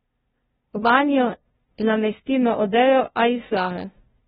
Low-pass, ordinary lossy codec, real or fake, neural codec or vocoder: 7.2 kHz; AAC, 16 kbps; fake; codec, 16 kHz, 0.5 kbps, FunCodec, trained on LibriTTS, 25 frames a second